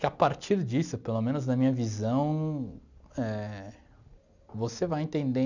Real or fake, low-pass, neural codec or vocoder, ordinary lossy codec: real; 7.2 kHz; none; none